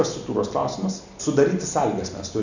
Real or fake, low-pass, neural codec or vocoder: real; 7.2 kHz; none